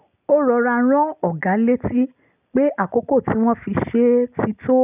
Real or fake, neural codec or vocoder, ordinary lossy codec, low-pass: real; none; none; 3.6 kHz